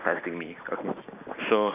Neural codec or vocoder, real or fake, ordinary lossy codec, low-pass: none; real; none; 3.6 kHz